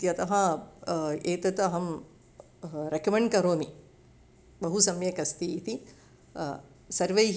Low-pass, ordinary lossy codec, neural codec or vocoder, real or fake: none; none; none; real